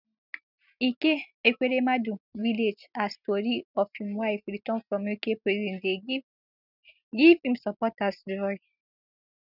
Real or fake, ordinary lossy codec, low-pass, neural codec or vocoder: real; none; 5.4 kHz; none